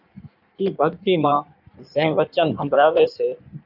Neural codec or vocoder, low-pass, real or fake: codec, 16 kHz in and 24 kHz out, 1.1 kbps, FireRedTTS-2 codec; 5.4 kHz; fake